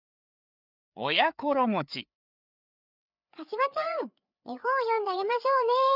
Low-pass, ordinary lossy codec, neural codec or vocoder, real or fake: 5.4 kHz; none; codec, 16 kHz, 4 kbps, FreqCodec, larger model; fake